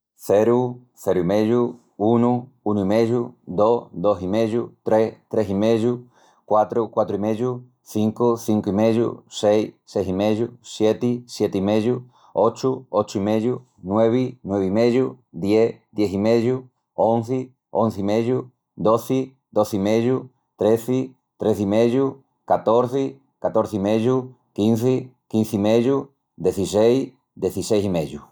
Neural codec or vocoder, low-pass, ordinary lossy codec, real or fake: none; none; none; real